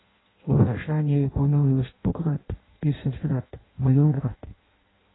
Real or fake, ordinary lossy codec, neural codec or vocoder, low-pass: fake; AAC, 16 kbps; codec, 16 kHz in and 24 kHz out, 0.6 kbps, FireRedTTS-2 codec; 7.2 kHz